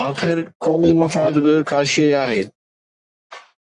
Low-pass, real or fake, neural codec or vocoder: 10.8 kHz; fake; codec, 44.1 kHz, 1.7 kbps, Pupu-Codec